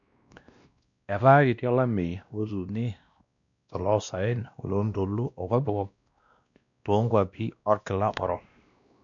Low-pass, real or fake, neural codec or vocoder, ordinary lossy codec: 7.2 kHz; fake; codec, 16 kHz, 1 kbps, X-Codec, WavLM features, trained on Multilingual LibriSpeech; none